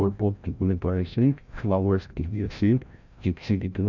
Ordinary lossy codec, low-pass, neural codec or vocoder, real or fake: none; 7.2 kHz; codec, 16 kHz, 0.5 kbps, FreqCodec, larger model; fake